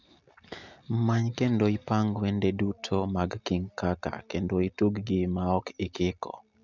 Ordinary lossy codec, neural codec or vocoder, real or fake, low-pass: none; none; real; 7.2 kHz